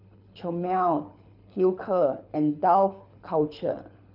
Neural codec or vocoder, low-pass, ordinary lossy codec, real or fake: codec, 24 kHz, 6 kbps, HILCodec; 5.4 kHz; none; fake